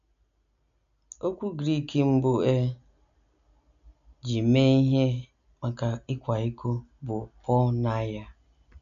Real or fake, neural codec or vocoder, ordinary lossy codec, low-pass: real; none; none; 7.2 kHz